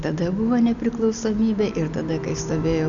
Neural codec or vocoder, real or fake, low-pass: none; real; 7.2 kHz